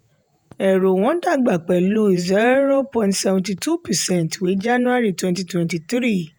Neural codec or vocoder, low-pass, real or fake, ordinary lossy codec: vocoder, 48 kHz, 128 mel bands, Vocos; none; fake; none